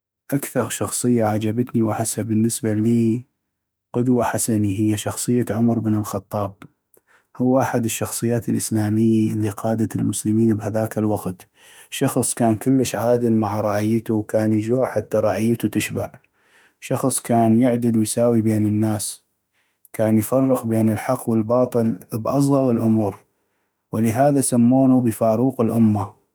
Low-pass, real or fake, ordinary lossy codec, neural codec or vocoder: none; fake; none; autoencoder, 48 kHz, 32 numbers a frame, DAC-VAE, trained on Japanese speech